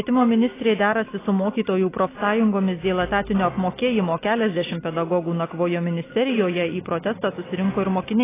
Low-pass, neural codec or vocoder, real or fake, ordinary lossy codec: 3.6 kHz; none; real; AAC, 16 kbps